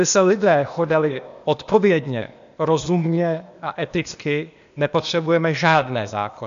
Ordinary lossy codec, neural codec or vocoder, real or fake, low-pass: MP3, 64 kbps; codec, 16 kHz, 0.8 kbps, ZipCodec; fake; 7.2 kHz